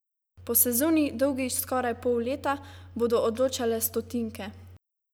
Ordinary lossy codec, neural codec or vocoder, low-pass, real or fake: none; none; none; real